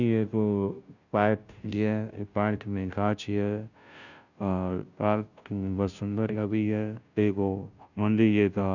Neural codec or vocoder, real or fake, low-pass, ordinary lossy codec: codec, 16 kHz, 0.5 kbps, FunCodec, trained on Chinese and English, 25 frames a second; fake; 7.2 kHz; none